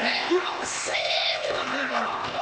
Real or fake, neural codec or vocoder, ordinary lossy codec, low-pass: fake; codec, 16 kHz, 0.8 kbps, ZipCodec; none; none